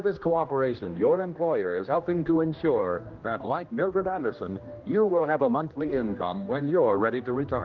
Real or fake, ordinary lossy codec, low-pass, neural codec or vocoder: fake; Opus, 24 kbps; 7.2 kHz; codec, 16 kHz, 1 kbps, X-Codec, HuBERT features, trained on general audio